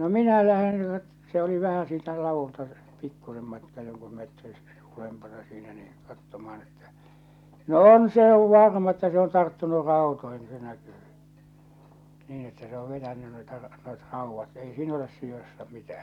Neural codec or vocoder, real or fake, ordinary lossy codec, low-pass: none; real; none; 19.8 kHz